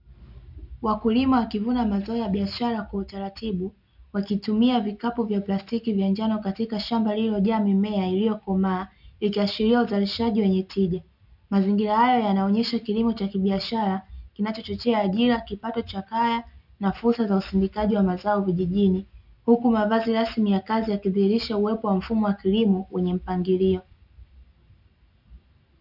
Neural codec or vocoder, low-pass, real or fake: none; 5.4 kHz; real